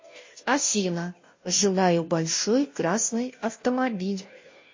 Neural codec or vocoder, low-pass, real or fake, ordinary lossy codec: codec, 16 kHz, 0.5 kbps, FunCodec, trained on Chinese and English, 25 frames a second; 7.2 kHz; fake; MP3, 32 kbps